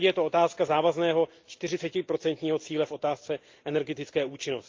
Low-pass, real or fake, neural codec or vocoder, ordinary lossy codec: 7.2 kHz; real; none; Opus, 32 kbps